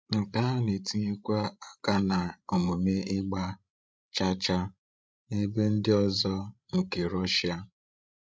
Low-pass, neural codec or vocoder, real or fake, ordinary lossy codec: none; codec, 16 kHz, 16 kbps, FreqCodec, larger model; fake; none